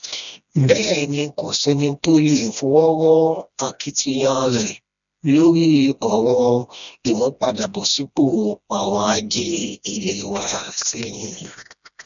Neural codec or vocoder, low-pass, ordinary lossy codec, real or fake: codec, 16 kHz, 1 kbps, FreqCodec, smaller model; 7.2 kHz; none; fake